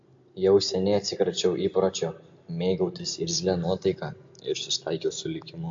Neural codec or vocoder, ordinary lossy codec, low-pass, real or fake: none; AAC, 64 kbps; 7.2 kHz; real